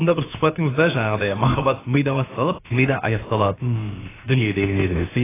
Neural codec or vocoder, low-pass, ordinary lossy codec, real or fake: codec, 16 kHz, about 1 kbps, DyCAST, with the encoder's durations; 3.6 kHz; AAC, 16 kbps; fake